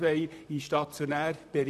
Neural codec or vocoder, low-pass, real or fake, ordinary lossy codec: vocoder, 44.1 kHz, 128 mel bands, Pupu-Vocoder; 14.4 kHz; fake; none